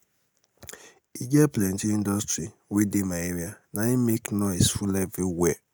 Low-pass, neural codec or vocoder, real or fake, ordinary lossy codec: none; none; real; none